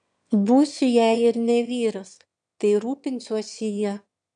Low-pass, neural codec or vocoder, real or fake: 9.9 kHz; autoencoder, 22.05 kHz, a latent of 192 numbers a frame, VITS, trained on one speaker; fake